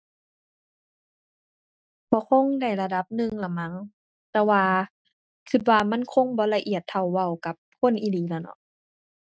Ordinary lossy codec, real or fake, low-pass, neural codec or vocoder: none; real; none; none